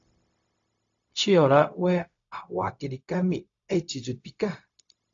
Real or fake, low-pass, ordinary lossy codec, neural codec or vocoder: fake; 7.2 kHz; AAC, 48 kbps; codec, 16 kHz, 0.4 kbps, LongCat-Audio-Codec